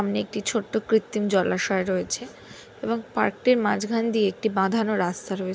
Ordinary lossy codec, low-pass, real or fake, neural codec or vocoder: none; none; real; none